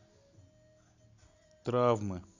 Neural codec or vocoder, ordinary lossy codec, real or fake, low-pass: none; none; real; 7.2 kHz